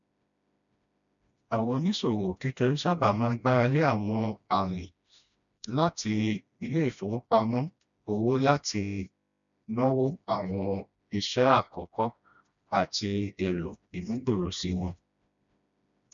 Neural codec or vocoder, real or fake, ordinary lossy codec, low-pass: codec, 16 kHz, 1 kbps, FreqCodec, smaller model; fake; none; 7.2 kHz